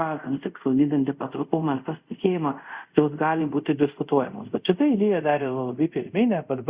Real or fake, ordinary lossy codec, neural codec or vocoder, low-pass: fake; Opus, 64 kbps; codec, 24 kHz, 0.5 kbps, DualCodec; 3.6 kHz